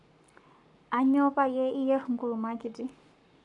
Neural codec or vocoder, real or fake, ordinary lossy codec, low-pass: codec, 44.1 kHz, 7.8 kbps, Pupu-Codec; fake; none; 10.8 kHz